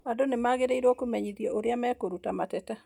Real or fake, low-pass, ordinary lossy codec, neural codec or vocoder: real; 19.8 kHz; none; none